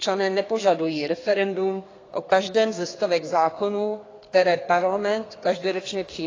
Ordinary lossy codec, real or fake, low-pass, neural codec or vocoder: AAC, 32 kbps; fake; 7.2 kHz; codec, 32 kHz, 1.9 kbps, SNAC